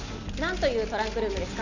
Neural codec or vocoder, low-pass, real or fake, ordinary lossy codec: none; 7.2 kHz; real; none